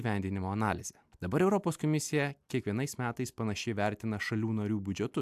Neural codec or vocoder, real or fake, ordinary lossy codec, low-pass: none; real; AAC, 96 kbps; 14.4 kHz